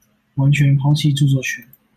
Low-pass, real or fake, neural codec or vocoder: 14.4 kHz; real; none